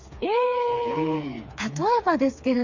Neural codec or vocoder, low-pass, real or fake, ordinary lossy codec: codec, 16 kHz, 4 kbps, FreqCodec, smaller model; 7.2 kHz; fake; Opus, 64 kbps